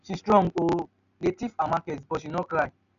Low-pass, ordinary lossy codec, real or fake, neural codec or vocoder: 7.2 kHz; none; real; none